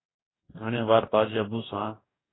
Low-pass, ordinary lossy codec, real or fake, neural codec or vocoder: 7.2 kHz; AAC, 16 kbps; fake; codec, 44.1 kHz, 2.6 kbps, DAC